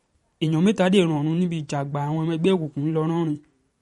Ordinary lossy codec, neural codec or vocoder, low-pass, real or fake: MP3, 48 kbps; vocoder, 44.1 kHz, 128 mel bands every 512 samples, BigVGAN v2; 19.8 kHz; fake